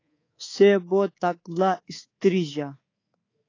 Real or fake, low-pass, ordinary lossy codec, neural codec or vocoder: fake; 7.2 kHz; AAC, 32 kbps; codec, 24 kHz, 3.1 kbps, DualCodec